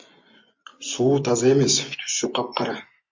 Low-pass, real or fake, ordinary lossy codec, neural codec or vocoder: 7.2 kHz; fake; MP3, 48 kbps; vocoder, 24 kHz, 100 mel bands, Vocos